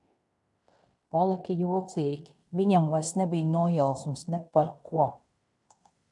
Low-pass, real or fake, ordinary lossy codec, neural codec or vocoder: 10.8 kHz; fake; MP3, 96 kbps; codec, 16 kHz in and 24 kHz out, 0.9 kbps, LongCat-Audio-Codec, fine tuned four codebook decoder